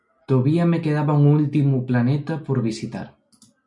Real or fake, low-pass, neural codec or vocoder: real; 10.8 kHz; none